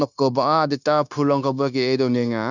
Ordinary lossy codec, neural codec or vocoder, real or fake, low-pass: none; codec, 16 kHz, 0.9 kbps, LongCat-Audio-Codec; fake; 7.2 kHz